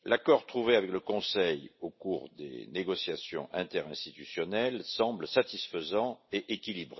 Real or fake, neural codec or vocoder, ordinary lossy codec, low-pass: real; none; MP3, 24 kbps; 7.2 kHz